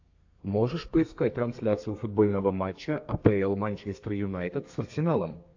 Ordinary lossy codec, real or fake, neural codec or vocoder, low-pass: AAC, 48 kbps; fake; codec, 32 kHz, 1.9 kbps, SNAC; 7.2 kHz